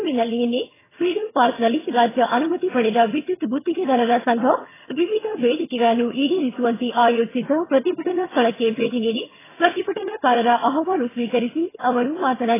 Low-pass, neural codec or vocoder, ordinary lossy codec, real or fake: 3.6 kHz; vocoder, 22.05 kHz, 80 mel bands, HiFi-GAN; AAC, 16 kbps; fake